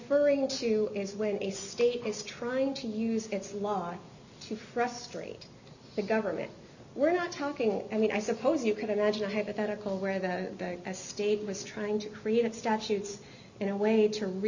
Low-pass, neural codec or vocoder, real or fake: 7.2 kHz; none; real